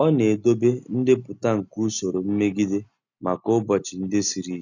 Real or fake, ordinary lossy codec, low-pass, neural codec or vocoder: real; none; 7.2 kHz; none